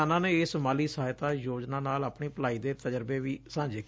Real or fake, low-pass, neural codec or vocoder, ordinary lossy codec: real; none; none; none